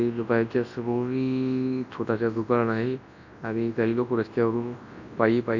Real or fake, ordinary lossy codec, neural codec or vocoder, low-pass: fake; Opus, 64 kbps; codec, 24 kHz, 0.9 kbps, WavTokenizer, large speech release; 7.2 kHz